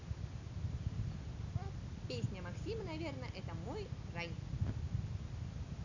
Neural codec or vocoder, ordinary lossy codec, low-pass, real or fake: none; none; 7.2 kHz; real